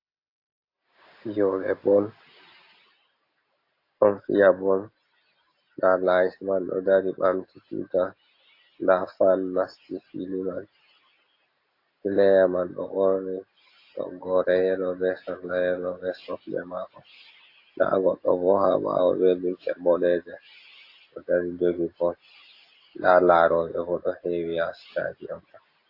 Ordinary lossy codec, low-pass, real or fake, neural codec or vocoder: AAC, 32 kbps; 5.4 kHz; real; none